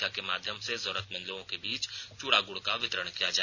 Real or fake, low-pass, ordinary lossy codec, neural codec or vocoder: real; none; none; none